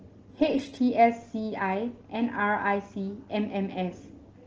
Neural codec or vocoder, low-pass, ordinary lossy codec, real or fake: none; 7.2 kHz; Opus, 16 kbps; real